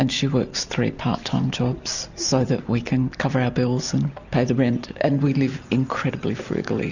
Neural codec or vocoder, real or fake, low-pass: none; real; 7.2 kHz